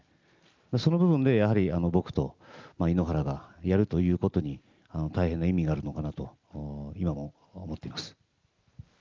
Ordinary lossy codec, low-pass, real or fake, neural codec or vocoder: Opus, 24 kbps; 7.2 kHz; real; none